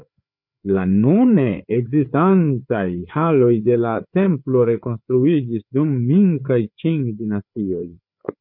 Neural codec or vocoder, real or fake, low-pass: codec, 16 kHz, 4 kbps, FreqCodec, larger model; fake; 5.4 kHz